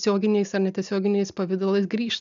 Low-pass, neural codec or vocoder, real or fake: 7.2 kHz; none; real